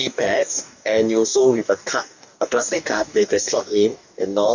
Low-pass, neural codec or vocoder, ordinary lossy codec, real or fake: 7.2 kHz; codec, 44.1 kHz, 2.6 kbps, DAC; none; fake